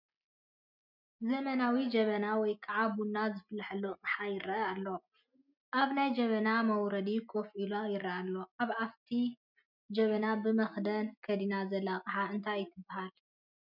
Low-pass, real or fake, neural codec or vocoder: 5.4 kHz; real; none